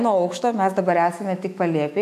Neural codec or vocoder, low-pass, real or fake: autoencoder, 48 kHz, 128 numbers a frame, DAC-VAE, trained on Japanese speech; 14.4 kHz; fake